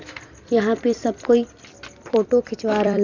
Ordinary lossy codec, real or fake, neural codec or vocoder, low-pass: Opus, 64 kbps; fake; vocoder, 44.1 kHz, 128 mel bands every 256 samples, BigVGAN v2; 7.2 kHz